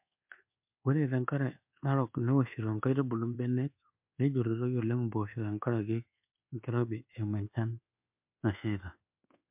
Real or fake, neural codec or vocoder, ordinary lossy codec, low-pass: fake; codec, 24 kHz, 1.2 kbps, DualCodec; MP3, 32 kbps; 3.6 kHz